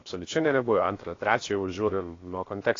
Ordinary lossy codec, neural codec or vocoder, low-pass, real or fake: AAC, 32 kbps; codec, 16 kHz, 0.7 kbps, FocalCodec; 7.2 kHz; fake